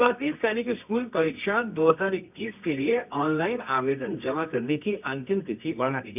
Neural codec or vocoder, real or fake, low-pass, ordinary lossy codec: codec, 24 kHz, 0.9 kbps, WavTokenizer, medium music audio release; fake; 3.6 kHz; none